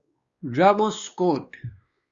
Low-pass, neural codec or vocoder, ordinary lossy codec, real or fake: 7.2 kHz; codec, 16 kHz, 2 kbps, X-Codec, WavLM features, trained on Multilingual LibriSpeech; Opus, 64 kbps; fake